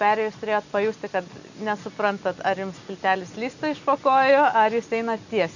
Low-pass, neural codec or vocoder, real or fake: 7.2 kHz; none; real